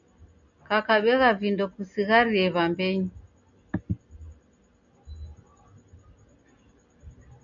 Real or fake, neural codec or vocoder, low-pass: real; none; 7.2 kHz